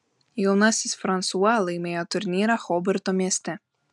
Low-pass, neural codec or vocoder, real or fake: 10.8 kHz; none; real